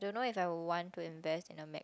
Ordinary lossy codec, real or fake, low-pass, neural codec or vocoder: none; real; none; none